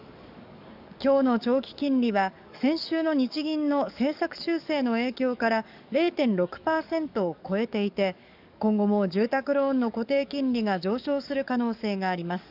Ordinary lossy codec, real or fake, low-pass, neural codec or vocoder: none; fake; 5.4 kHz; codec, 44.1 kHz, 7.8 kbps, DAC